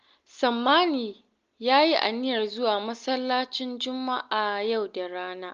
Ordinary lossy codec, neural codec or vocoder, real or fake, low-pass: Opus, 32 kbps; none; real; 7.2 kHz